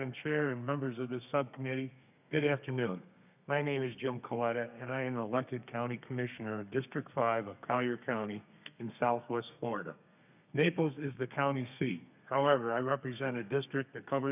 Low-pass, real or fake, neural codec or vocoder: 3.6 kHz; fake; codec, 32 kHz, 1.9 kbps, SNAC